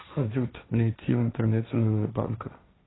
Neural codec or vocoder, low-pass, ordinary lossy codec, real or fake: codec, 16 kHz, 1.1 kbps, Voila-Tokenizer; 7.2 kHz; AAC, 16 kbps; fake